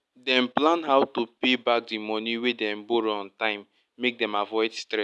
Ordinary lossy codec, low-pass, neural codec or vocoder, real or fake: none; none; none; real